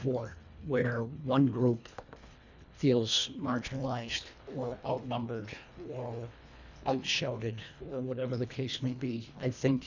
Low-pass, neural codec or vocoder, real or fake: 7.2 kHz; codec, 24 kHz, 1.5 kbps, HILCodec; fake